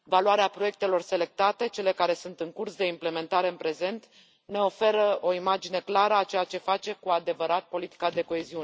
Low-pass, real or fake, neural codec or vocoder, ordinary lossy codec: none; real; none; none